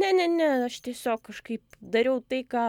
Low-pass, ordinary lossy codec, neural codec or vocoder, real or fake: 19.8 kHz; MP3, 96 kbps; none; real